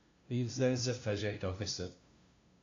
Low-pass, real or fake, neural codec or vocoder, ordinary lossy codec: 7.2 kHz; fake; codec, 16 kHz, 0.5 kbps, FunCodec, trained on LibriTTS, 25 frames a second; AAC, 48 kbps